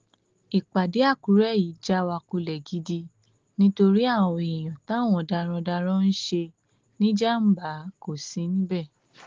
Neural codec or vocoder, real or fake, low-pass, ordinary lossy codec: none; real; 7.2 kHz; Opus, 16 kbps